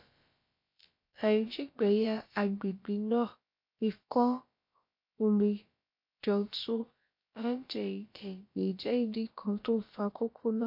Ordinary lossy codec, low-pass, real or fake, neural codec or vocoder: MP3, 32 kbps; 5.4 kHz; fake; codec, 16 kHz, about 1 kbps, DyCAST, with the encoder's durations